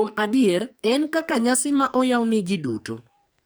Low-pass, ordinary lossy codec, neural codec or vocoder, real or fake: none; none; codec, 44.1 kHz, 2.6 kbps, SNAC; fake